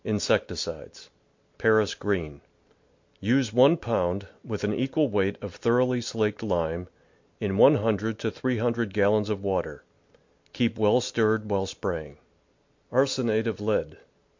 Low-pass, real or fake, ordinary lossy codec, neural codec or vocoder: 7.2 kHz; real; MP3, 48 kbps; none